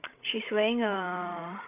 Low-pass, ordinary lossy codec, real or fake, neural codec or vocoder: 3.6 kHz; none; fake; codec, 16 kHz, 16 kbps, FreqCodec, larger model